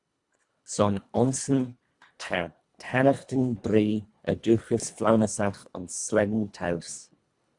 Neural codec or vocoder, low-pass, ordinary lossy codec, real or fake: codec, 24 kHz, 1.5 kbps, HILCodec; 10.8 kHz; Opus, 64 kbps; fake